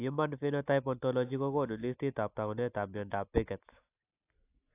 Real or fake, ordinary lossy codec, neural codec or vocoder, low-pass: real; AAC, 24 kbps; none; 3.6 kHz